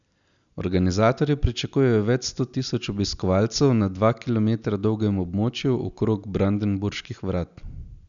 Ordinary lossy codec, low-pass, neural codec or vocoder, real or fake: none; 7.2 kHz; none; real